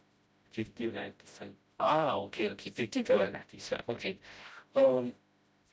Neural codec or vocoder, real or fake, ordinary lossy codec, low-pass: codec, 16 kHz, 0.5 kbps, FreqCodec, smaller model; fake; none; none